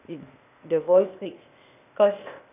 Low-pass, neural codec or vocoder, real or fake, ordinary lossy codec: 3.6 kHz; codec, 16 kHz, 0.8 kbps, ZipCodec; fake; none